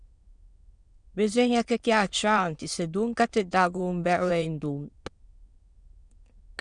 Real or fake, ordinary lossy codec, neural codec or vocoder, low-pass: fake; Opus, 64 kbps; autoencoder, 22.05 kHz, a latent of 192 numbers a frame, VITS, trained on many speakers; 9.9 kHz